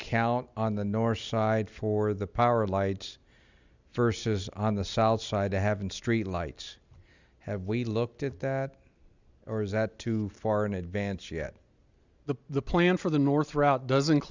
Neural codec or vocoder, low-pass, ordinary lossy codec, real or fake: none; 7.2 kHz; Opus, 64 kbps; real